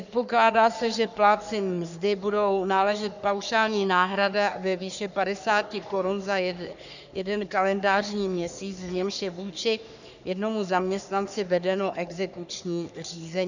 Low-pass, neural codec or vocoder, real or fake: 7.2 kHz; codec, 44.1 kHz, 3.4 kbps, Pupu-Codec; fake